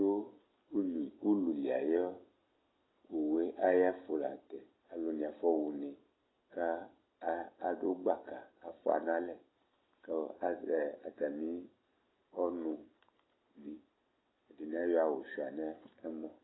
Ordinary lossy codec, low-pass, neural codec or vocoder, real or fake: AAC, 16 kbps; 7.2 kHz; none; real